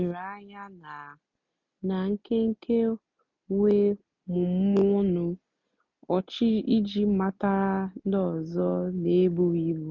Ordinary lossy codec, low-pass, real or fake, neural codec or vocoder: none; 7.2 kHz; real; none